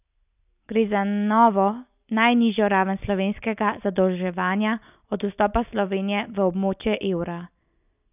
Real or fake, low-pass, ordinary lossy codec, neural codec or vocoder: real; 3.6 kHz; none; none